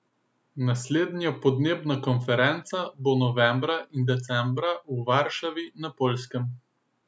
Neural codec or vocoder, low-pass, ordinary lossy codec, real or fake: none; none; none; real